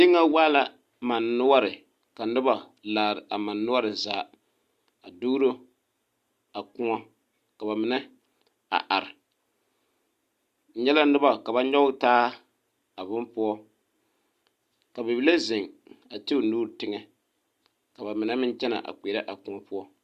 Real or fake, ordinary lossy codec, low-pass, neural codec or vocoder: real; Opus, 64 kbps; 14.4 kHz; none